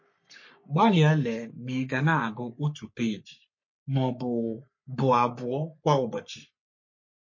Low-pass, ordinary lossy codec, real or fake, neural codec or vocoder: 7.2 kHz; MP3, 32 kbps; fake; codec, 44.1 kHz, 3.4 kbps, Pupu-Codec